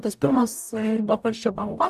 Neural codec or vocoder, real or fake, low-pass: codec, 44.1 kHz, 0.9 kbps, DAC; fake; 14.4 kHz